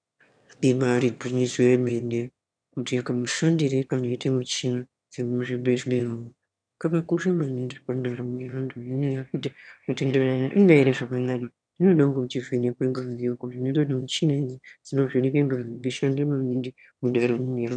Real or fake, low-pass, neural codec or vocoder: fake; 9.9 kHz; autoencoder, 22.05 kHz, a latent of 192 numbers a frame, VITS, trained on one speaker